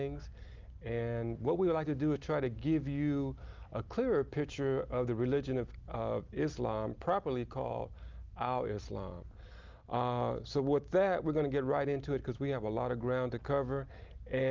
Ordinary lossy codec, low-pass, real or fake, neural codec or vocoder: Opus, 24 kbps; 7.2 kHz; real; none